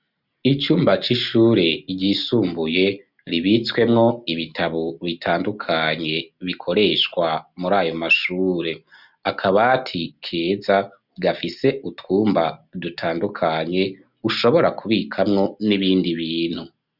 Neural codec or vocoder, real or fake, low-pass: none; real; 5.4 kHz